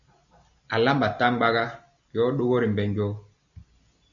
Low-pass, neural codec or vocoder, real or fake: 7.2 kHz; none; real